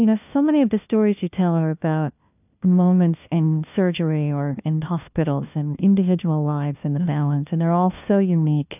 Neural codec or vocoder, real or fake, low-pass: codec, 16 kHz, 1 kbps, FunCodec, trained on LibriTTS, 50 frames a second; fake; 3.6 kHz